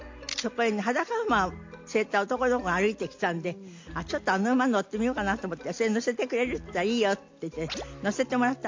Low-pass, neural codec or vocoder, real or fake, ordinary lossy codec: 7.2 kHz; none; real; MP3, 48 kbps